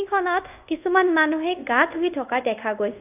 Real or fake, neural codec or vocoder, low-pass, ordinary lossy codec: fake; codec, 24 kHz, 0.5 kbps, DualCodec; 3.6 kHz; AAC, 32 kbps